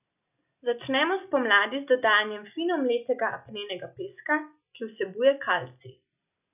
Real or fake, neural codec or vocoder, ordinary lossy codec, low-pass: real; none; none; 3.6 kHz